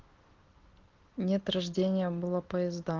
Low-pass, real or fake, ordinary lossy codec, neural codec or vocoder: 7.2 kHz; real; Opus, 16 kbps; none